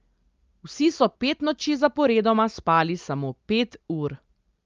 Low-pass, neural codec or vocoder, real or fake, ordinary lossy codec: 7.2 kHz; none; real; Opus, 32 kbps